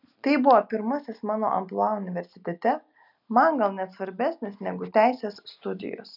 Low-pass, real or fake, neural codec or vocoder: 5.4 kHz; real; none